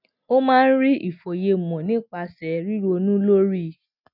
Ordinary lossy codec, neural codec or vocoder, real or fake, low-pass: none; none; real; 5.4 kHz